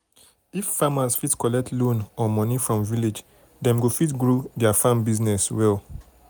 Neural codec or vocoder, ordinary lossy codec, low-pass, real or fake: none; none; none; real